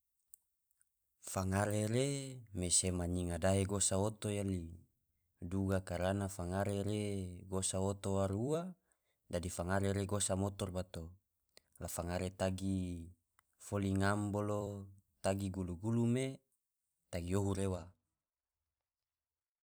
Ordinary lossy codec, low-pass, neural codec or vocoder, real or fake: none; none; vocoder, 44.1 kHz, 128 mel bands every 256 samples, BigVGAN v2; fake